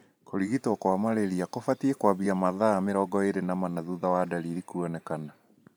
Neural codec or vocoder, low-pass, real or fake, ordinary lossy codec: vocoder, 44.1 kHz, 128 mel bands every 512 samples, BigVGAN v2; none; fake; none